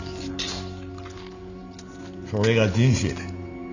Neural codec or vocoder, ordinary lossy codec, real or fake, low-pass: none; none; real; 7.2 kHz